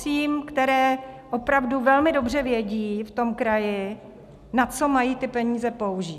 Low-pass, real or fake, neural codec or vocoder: 14.4 kHz; real; none